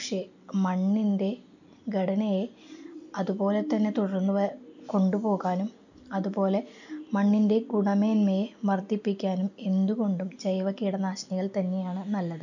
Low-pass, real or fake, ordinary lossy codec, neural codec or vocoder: 7.2 kHz; real; none; none